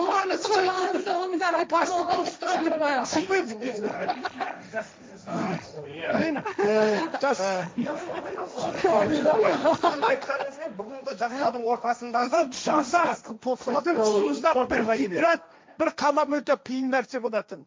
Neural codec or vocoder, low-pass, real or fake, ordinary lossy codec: codec, 16 kHz, 1.1 kbps, Voila-Tokenizer; none; fake; none